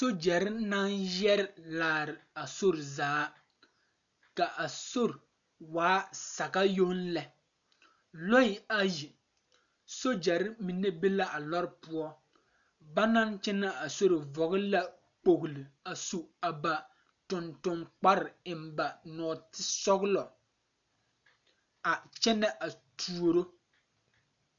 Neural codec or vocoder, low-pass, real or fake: none; 7.2 kHz; real